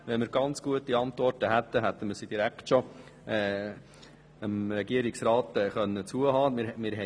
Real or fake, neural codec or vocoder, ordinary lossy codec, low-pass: real; none; none; none